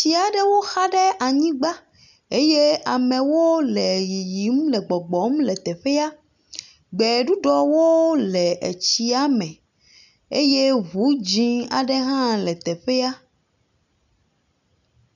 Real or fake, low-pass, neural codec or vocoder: real; 7.2 kHz; none